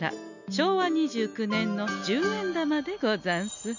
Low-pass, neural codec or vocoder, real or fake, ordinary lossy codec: 7.2 kHz; none; real; none